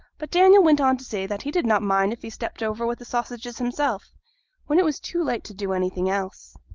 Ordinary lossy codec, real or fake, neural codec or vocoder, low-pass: Opus, 32 kbps; real; none; 7.2 kHz